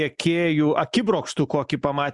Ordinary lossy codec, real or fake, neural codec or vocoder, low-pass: Opus, 64 kbps; real; none; 10.8 kHz